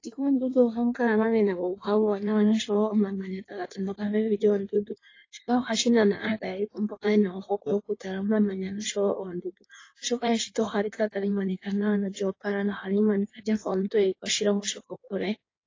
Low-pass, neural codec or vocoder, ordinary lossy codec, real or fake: 7.2 kHz; codec, 16 kHz in and 24 kHz out, 1.1 kbps, FireRedTTS-2 codec; AAC, 32 kbps; fake